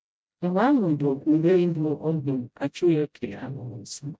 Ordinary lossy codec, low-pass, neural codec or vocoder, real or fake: none; none; codec, 16 kHz, 0.5 kbps, FreqCodec, smaller model; fake